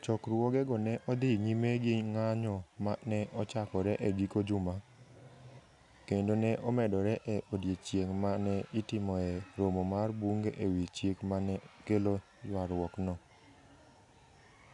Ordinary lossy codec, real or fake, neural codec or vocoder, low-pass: none; real; none; 10.8 kHz